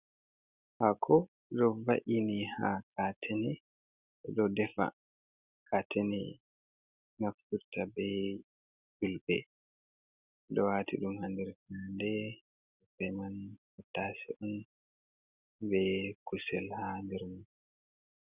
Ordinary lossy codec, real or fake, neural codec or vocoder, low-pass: Opus, 64 kbps; real; none; 3.6 kHz